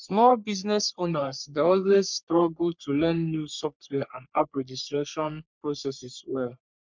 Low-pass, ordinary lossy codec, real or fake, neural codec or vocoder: 7.2 kHz; MP3, 64 kbps; fake; codec, 44.1 kHz, 3.4 kbps, Pupu-Codec